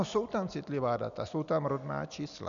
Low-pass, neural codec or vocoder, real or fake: 7.2 kHz; none; real